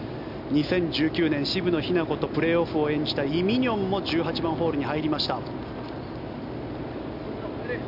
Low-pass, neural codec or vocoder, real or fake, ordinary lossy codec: 5.4 kHz; none; real; none